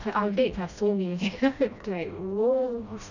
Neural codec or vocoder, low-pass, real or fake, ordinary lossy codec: codec, 16 kHz, 1 kbps, FreqCodec, smaller model; 7.2 kHz; fake; none